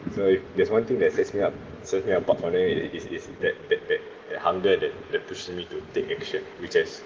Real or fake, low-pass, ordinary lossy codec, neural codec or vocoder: real; 7.2 kHz; Opus, 16 kbps; none